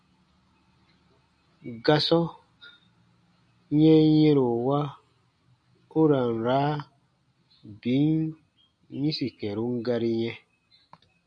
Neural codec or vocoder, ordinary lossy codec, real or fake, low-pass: none; MP3, 64 kbps; real; 9.9 kHz